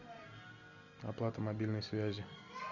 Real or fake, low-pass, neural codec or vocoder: real; 7.2 kHz; none